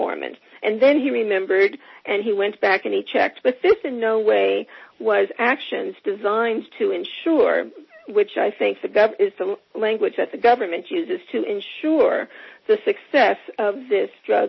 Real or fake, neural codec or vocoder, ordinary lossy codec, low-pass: real; none; MP3, 24 kbps; 7.2 kHz